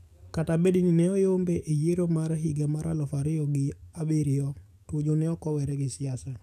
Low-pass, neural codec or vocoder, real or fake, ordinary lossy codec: 14.4 kHz; codec, 44.1 kHz, 7.8 kbps, DAC; fake; none